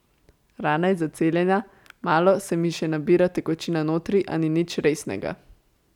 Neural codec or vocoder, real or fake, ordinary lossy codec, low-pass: none; real; none; 19.8 kHz